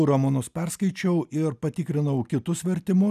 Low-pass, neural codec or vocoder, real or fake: 14.4 kHz; none; real